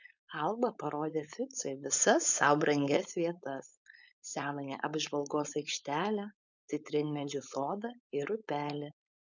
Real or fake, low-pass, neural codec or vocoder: fake; 7.2 kHz; codec, 16 kHz, 4.8 kbps, FACodec